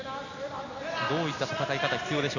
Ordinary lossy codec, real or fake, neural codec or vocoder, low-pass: none; real; none; 7.2 kHz